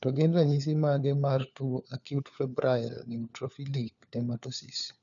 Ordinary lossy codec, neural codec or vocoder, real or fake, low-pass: none; codec, 16 kHz, 4 kbps, FunCodec, trained on LibriTTS, 50 frames a second; fake; 7.2 kHz